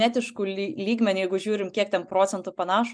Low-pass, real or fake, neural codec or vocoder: 9.9 kHz; real; none